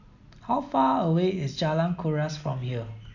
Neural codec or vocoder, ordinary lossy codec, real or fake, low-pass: none; none; real; 7.2 kHz